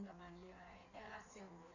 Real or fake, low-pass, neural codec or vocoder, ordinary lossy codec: fake; 7.2 kHz; codec, 16 kHz in and 24 kHz out, 1.1 kbps, FireRedTTS-2 codec; none